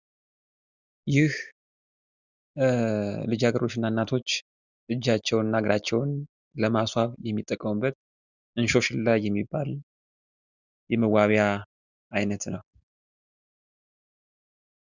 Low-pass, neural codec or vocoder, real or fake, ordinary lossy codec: 7.2 kHz; none; real; Opus, 64 kbps